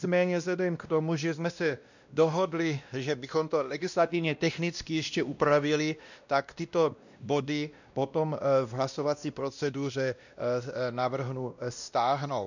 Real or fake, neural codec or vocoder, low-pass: fake; codec, 16 kHz, 1 kbps, X-Codec, WavLM features, trained on Multilingual LibriSpeech; 7.2 kHz